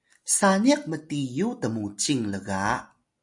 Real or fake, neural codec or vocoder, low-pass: real; none; 10.8 kHz